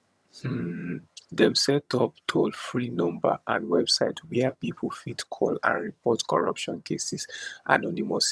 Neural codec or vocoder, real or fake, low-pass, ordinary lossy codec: vocoder, 22.05 kHz, 80 mel bands, HiFi-GAN; fake; none; none